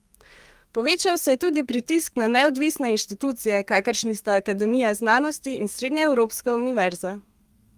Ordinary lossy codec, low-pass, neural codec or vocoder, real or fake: Opus, 32 kbps; 14.4 kHz; codec, 32 kHz, 1.9 kbps, SNAC; fake